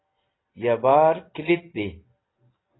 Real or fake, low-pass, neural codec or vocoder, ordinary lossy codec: real; 7.2 kHz; none; AAC, 16 kbps